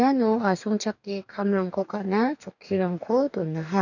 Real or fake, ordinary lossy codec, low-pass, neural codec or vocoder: fake; none; 7.2 kHz; codec, 44.1 kHz, 2.6 kbps, DAC